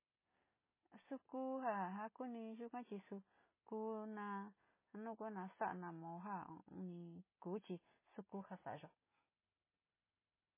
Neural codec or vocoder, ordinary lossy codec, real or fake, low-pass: none; MP3, 16 kbps; real; 3.6 kHz